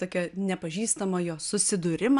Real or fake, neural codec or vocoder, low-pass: real; none; 10.8 kHz